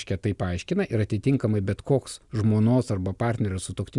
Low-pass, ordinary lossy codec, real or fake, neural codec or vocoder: 10.8 kHz; Opus, 64 kbps; real; none